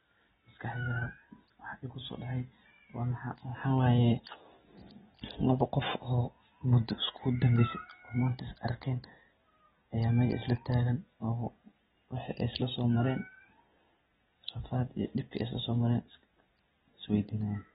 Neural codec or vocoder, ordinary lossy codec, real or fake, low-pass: none; AAC, 16 kbps; real; 19.8 kHz